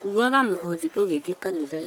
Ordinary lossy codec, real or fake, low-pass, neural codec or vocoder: none; fake; none; codec, 44.1 kHz, 1.7 kbps, Pupu-Codec